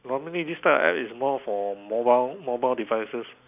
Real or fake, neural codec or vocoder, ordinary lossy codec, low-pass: real; none; none; 3.6 kHz